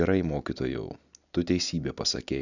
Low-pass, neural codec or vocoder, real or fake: 7.2 kHz; none; real